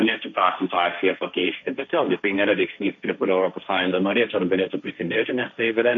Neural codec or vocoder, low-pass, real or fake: codec, 16 kHz, 1.1 kbps, Voila-Tokenizer; 7.2 kHz; fake